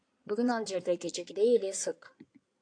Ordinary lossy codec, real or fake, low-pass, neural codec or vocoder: AAC, 48 kbps; fake; 9.9 kHz; codec, 16 kHz in and 24 kHz out, 2.2 kbps, FireRedTTS-2 codec